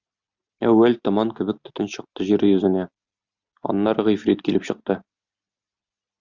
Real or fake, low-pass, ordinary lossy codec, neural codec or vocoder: real; 7.2 kHz; Opus, 64 kbps; none